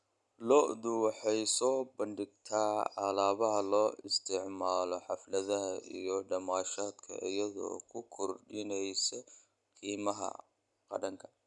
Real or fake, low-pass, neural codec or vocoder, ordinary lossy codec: real; 10.8 kHz; none; none